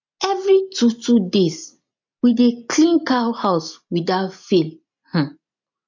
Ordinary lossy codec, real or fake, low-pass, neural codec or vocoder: MP3, 48 kbps; real; 7.2 kHz; none